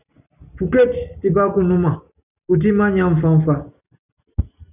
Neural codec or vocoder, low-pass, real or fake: none; 3.6 kHz; real